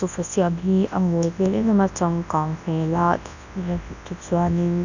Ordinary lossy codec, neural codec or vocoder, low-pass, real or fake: none; codec, 24 kHz, 0.9 kbps, WavTokenizer, large speech release; 7.2 kHz; fake